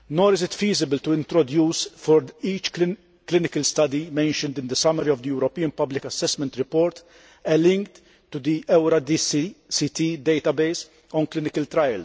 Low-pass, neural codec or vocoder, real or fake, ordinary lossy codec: none; none; real; none